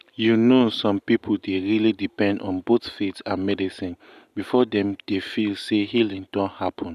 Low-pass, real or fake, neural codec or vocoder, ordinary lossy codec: 14.4 kHz; real; none; none